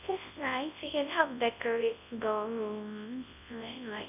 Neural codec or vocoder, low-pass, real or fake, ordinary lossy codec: codec, 24 kHz, 0.9 kbps, WavTokenizer, large speech release; 3.6 kHz; fake; none